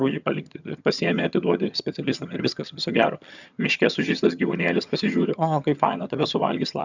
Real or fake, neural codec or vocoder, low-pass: fake; vocoder, 22.05 kHz, 80 mel bands, HiFi-GAN; 7.2 kHz